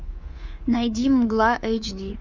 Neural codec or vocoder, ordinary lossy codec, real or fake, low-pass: codec, 24 kHz, 3.1 kbps, DualCodec; Opus, 32 kbps; fake; 7.2 kHz